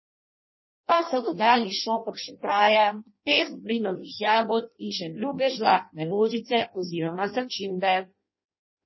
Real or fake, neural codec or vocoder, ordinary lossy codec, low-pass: fake; codec, 16 kHz in and 24 kHz out, 0.6 kbps, FireRedTTS-2 codec; MP3, 24 kbps; 7.2 kHz